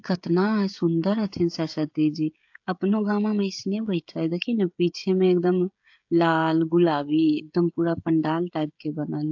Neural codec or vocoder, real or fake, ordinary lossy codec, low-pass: codec, 16 kHz, 16 kbps, FreqCodec, smaller model; fake; AAC, 48 kbps; 7.2 kHz